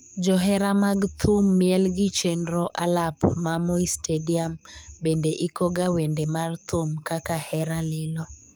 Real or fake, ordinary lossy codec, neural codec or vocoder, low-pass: fake; none; codec, 44.1 kHz, 7.8 kbps, DAC; none